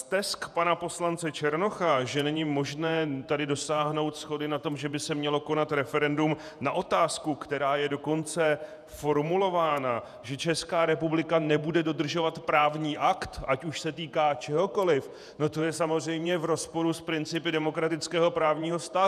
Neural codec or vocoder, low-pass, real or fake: vocoder, 48 kHz, 128 mel bands, Vocos; 14.4 kHz; fake